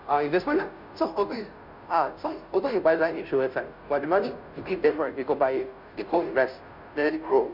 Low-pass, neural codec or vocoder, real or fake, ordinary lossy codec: 5.4 kHz; codec, 16 kHz, 0.5 kbps, FunCodec, trained on Chinese and English, 25 frames a second; fake; none